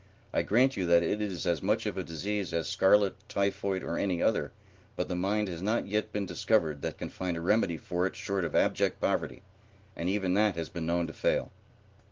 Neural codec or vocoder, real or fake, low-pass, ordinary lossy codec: none; real; 7.2 kHz; Opus, 16 kbps